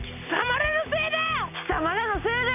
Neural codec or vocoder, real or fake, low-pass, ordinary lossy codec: none; real; 3.6 kHz; none